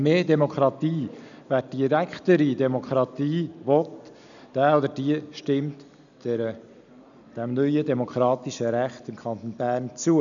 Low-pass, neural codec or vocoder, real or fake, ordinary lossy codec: 7.2 kHz; none; real; none